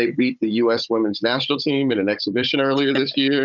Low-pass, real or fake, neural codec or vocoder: 7.2 kHz; fake; codec, 16 kHz, 16 kbps, FunCodec, trained on Chinese and English, 50 frames a second